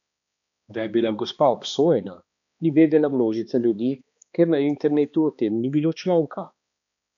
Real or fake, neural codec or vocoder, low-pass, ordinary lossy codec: fake; codec, 16 kHz, 2 kbps, X-Codec, HuBERT features, trained on balanced general audio; 7.2 kHz; none